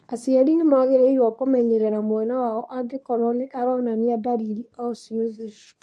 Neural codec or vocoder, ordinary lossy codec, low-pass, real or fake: codec, 24 kHz, 0.9 kbps, WavTokenizer, medium speech release version 2; none; none; fake